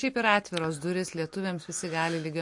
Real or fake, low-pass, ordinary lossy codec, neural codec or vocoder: real; 10.8 kHz; MP3, 48 kbps; none